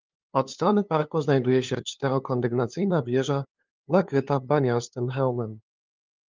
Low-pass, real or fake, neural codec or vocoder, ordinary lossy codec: 7.2 kHz; fake; codec, 16 kHz, 2 kbps, FunCodec, trained on LibriTTS, 25 frames a second; Opus, 32 kbps